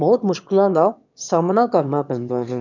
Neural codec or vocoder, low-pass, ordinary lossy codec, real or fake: autoencoder, 22.05 kHz, a latent of 192 numbers a frame, VITS, trained on one speaker; 7.2 kHz; none; fake